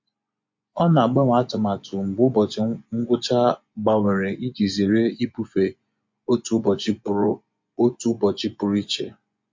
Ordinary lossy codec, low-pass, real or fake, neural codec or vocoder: MP3, 48 kbps; 7.2 kHz; fake; vocoder, 24 kHz, 100 mel bands, Vocos